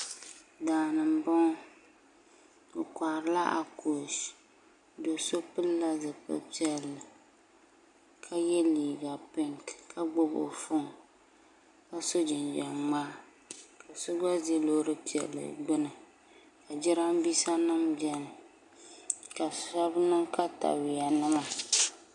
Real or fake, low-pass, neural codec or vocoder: real; 10.8 kHz; none